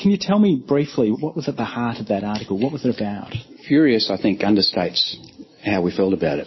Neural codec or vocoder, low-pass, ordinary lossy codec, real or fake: none; 7.2 kHz; MP3, 24 kbps; real